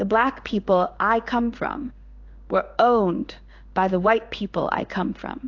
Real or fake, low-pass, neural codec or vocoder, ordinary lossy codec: fake; 7.2 kHz; codec, 16 kHz in and 24 kHz out, 1 kbps, XY-Tokenizer; AAC, 48 kbps